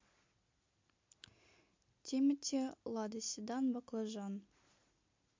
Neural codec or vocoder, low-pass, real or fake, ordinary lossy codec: none; 7.2 kHz; real; MP3, 48 kbps